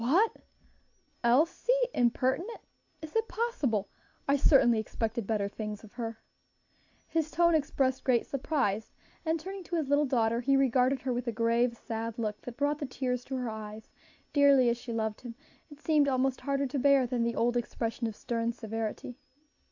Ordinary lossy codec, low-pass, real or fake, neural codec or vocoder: AAC, 48 kbps; 7.2 kHz; real; none